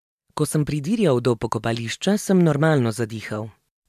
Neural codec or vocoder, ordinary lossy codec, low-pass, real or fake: none; MP3, 96 kbps; 14.4 kHz; real